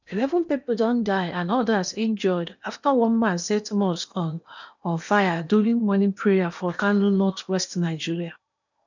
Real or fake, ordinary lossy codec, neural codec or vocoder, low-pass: fake; none; codec, 16 kHz in and 24 kHz out, 0.8 kbps, FocalCodec, streaming, 65536 codes; 7.2 kHz